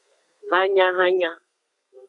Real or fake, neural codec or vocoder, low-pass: fake; codec, 32 kHz, 1.9 kbps, SNAC; 10.8 kHz